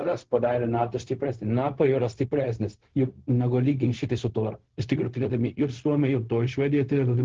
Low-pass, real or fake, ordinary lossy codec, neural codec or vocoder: 7.2 kHz; fake; Opus, 16 kbps; codec, 16 kHz, 0.4 kbps, LongCat-Audio-Codec